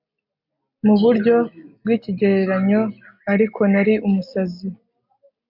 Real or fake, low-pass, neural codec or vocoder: real; 5.4 kHz; none